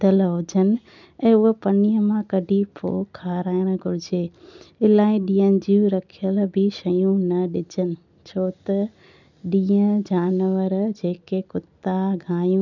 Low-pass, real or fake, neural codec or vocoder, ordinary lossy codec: 7.2 kHz; real; none; none